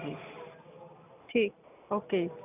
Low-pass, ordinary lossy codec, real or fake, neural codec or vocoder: 3.6 kHz; none; real; none